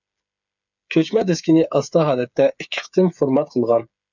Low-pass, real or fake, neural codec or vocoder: 7.2 kHz; fake; codec, 16 kHz, 8 kbps, FreqCodec, smaller model